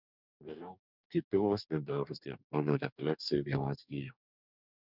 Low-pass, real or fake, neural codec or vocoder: 5.4 kHz; fake; codec, 44.1 kHz, 2.6 kbps, DAC